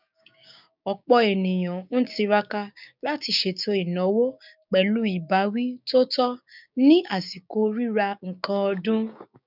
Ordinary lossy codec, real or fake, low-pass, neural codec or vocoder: none; fake; 5.4 kHz; codec, 16 kHz, 6 kbps, DAC